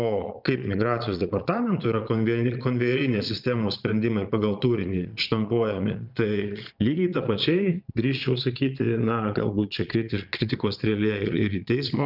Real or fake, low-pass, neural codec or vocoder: fake; 5.4 kHz; vocoder, 22.05 kHz, 80 mel bands, Vocos